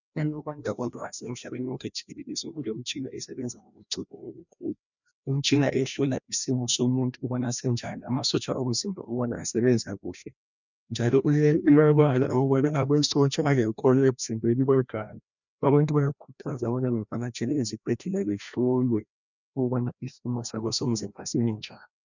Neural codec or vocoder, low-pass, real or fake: codec, 16 kHz, 1 kbps, FreqCodec, larger model; 7.2 kHz; fake